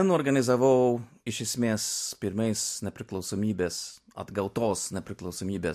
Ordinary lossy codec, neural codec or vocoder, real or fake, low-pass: MP3, 64 kbps; none; real; 14.4 kHz